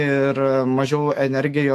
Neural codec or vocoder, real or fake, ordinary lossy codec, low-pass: autoencoder, 48 kHz, 128 numbers a frame, DAC-VAE, trained on Japanese speech; fake; AAC, 64 kbps; 14.4 kHz